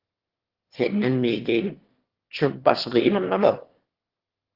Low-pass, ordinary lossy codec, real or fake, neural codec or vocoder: 5.4 kHz; Opus, 16 kbps; fake; autoencoder, 22.05 kHz, a latent of 192 numbers a frame, VITS, trained on one speaker